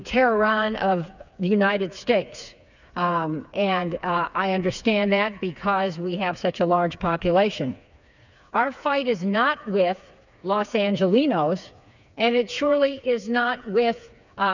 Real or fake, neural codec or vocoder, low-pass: fake; codec, 16 kHz, 4 kbps, FreqCodec, smaller model; 7.2 kHz